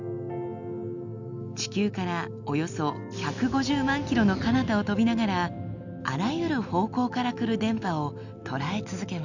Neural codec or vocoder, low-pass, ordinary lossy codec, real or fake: none; 7.2 kHz; none; real